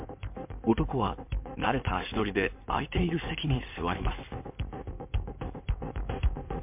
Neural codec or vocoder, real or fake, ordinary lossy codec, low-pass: codec, 16 kHz in and 24 kHz out, 2.2 kbps, FireRedTTS-2 codec; fake; MP3, 32 kbps; 3.6 kHz